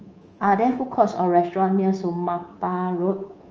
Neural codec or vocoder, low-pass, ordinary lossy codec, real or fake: codec, 24 kHz, 3.1 kbps, DualCodec; 7.2 kHz; Opus, 24 kbps; fake